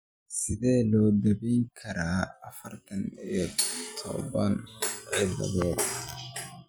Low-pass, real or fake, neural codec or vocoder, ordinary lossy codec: none; real; none; none